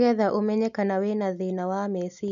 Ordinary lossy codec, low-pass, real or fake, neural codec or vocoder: none; 7.2 kHz; real; none